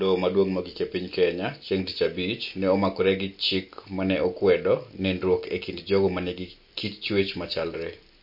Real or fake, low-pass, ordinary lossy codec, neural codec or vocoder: real; 5.4 kHz; MP3, 32 kbps; none